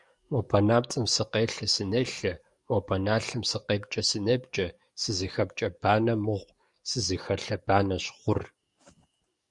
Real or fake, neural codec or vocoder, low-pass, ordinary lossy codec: fake; codec, 44.1 kHz, 7.8 kbps, DAC; 10.8 kHz; Opus, 64 kbps